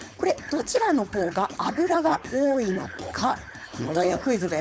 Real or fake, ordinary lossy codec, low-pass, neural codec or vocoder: fake; none; none; codec, 16 kHz, 4.8 kbps, FACodec